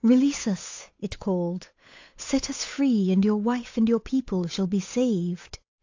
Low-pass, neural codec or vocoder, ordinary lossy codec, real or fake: 7.2 kHz; codec, 16 kHz, 8 kbps, FunCodec, trained on Chinese and English, 25 frames a second; AAC, 48 kbps; fake